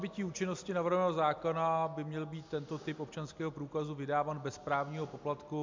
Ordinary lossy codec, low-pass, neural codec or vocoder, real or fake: MP3, 64 kbps; 7.2 kHz; none; real